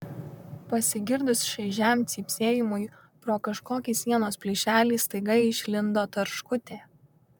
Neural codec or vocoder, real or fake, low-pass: vocoder, 44.1 kHz, 128 mel bands, Pupu-Vocoder; fake; 19.8 kHz